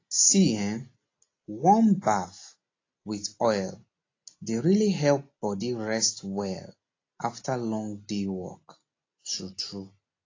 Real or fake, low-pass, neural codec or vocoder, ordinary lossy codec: real; 7.2 kHz; none; AAC, 32 kbps